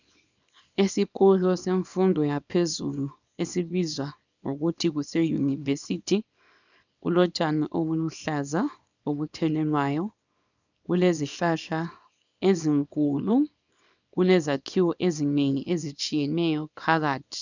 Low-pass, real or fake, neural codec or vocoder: 7.2 kHz; fake; codec, 24 kHz, 0.9 kbps, WavTokenizer, small release